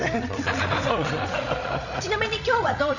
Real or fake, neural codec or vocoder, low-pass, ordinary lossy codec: fake; codec, 16 kHz, 16 kbps, FreqCodec, larger model; 7.2 kHz; none